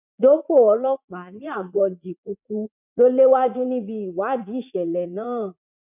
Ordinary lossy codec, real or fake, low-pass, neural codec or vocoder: none; fake; 3.6 kHz; vocoder, 44.1 kHz, 80 mel bands, Vocos